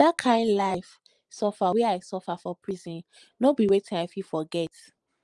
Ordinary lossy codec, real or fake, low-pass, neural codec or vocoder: Opus, 32 kbps; fake; 10.8 kHz; vocoder, 44.1 kHz, 128 mel bands every 512 samples, BigVGAN v2